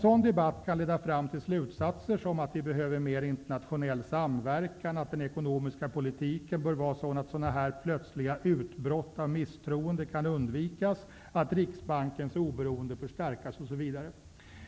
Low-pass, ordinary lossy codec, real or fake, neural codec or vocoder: none; none; real; none